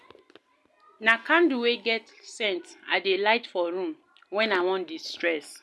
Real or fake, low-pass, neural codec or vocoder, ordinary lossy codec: real; none; none; none